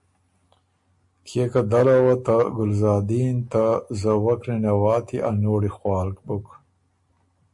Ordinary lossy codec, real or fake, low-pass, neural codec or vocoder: MP3, 48 kbps; real; 10.8 kHz; none